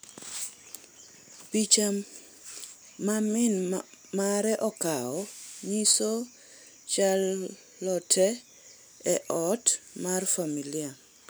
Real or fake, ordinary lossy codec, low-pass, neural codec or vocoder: real; none; none; none